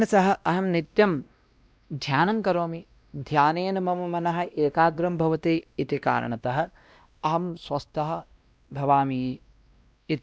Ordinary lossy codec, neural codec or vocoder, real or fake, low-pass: none; codec, 16 kHz, 1 kbps, X-Codec, WavLM features, trained on Multilingual LibriSpeech; fake; none